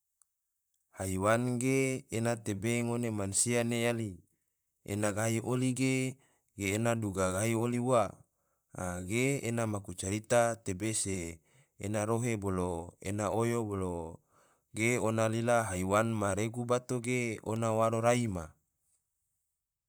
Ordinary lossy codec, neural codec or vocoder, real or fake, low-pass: none; vocoder, 44.1 kHz, 128 mel bands, Pupu-Vocoder; fake; none